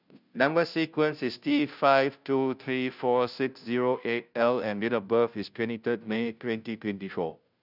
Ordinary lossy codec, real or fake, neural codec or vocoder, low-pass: none; fake; codec, 16 kHz, 0.5 kbps, FunCodec, trained on Chinese and English, 25 frames a second; 5.4 kHz